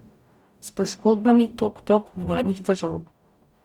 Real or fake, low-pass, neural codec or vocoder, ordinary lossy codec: fake; 19.8 kHz; codec, 44.1 kHz, 0.9 kbps, DAC; Opus, 64 kbps